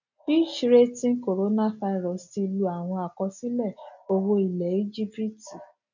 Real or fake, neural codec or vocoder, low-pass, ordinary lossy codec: real; none; 7.2 kHz; none